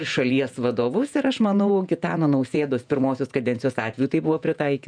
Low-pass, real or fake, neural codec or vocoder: 9.9 kHz; fake; vocoder, 48 kHz, 128 mel bands, Vocos